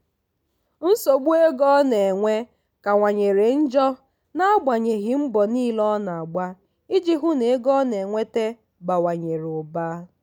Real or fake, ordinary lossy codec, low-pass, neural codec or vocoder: real; none; none; none